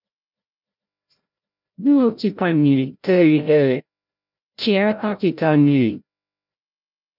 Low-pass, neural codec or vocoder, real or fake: 5.4 kHz; codec, 16 kHz, 0.5 kbps, FreqCodec, larger model; fake